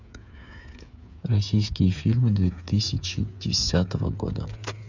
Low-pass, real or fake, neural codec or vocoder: 7.2 kHz; fake; codec, 16 kHz, 8 kbps, FreqCodec, smaller model